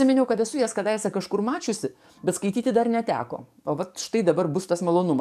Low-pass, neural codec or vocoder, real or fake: 14.4 kHz; codec, 44.1 kHz, 7.8 kbps, DAC; fake